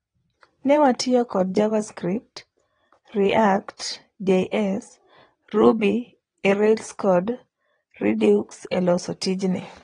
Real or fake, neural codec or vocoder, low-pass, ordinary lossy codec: fake; vocoder, 22.05 kHz, 80 mel bands, WaveNeXt; 9.9 kHz; AAC, 32 kbps